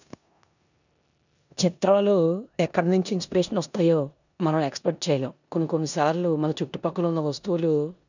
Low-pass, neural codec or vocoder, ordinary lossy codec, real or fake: 7.2 kHz; codec, 16 kHz in and 24 kHz out, 0.9 kbps, LongCat-Audio-Codec, four codebook decoder; none; fake